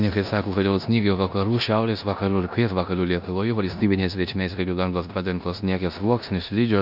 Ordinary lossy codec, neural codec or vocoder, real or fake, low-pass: AAC, 48 kbps; codec, 16 kHz in and 24 kHz out, 0.9 kbps, LongCat-Audio-Codec, four codebook decoder; fake; 5.4 kHz